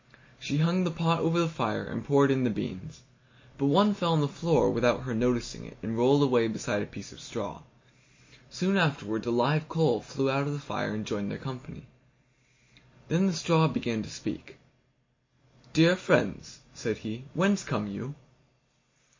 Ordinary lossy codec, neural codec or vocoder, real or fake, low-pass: MP3, 32 kbps; none; real; 7.2 kHz